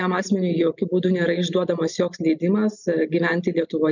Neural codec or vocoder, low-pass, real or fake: none; 7.2 kHz; real